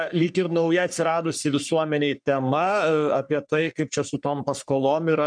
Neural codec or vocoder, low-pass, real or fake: codec, 44.1 kHz, 3.4 kbps, Pupu-Codec; 9.9 kHz; fake